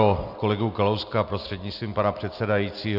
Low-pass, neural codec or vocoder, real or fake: 5.4 kHz; none; real